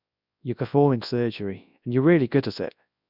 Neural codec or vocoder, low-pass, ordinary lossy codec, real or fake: codec, 24 kHz, 0.9 kbps, WavTokenizer, large speech release; 5.4 kHz; none; fake